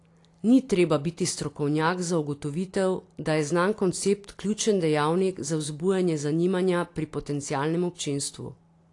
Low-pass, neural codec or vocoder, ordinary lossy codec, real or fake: 10.8 kHz; none; AAC, 48 kbps; real